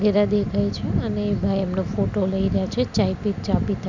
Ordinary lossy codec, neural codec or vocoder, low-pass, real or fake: none; none; 7.2 kHz; real